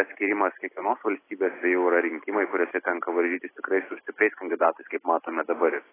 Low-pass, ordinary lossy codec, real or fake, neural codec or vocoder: 3.6 kHz; AAC, 16 kbps; real; none